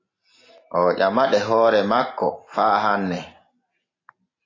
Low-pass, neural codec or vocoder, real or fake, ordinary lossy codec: 7.2 kHz; none; real; AAC, 32 kbps